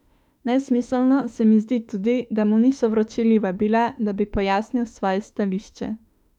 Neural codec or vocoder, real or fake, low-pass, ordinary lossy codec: autoencoder, 48 kHz, 32 numbers a frame, DAC-VAE, trained on Japanese speech; fake; 19.8 kHz; none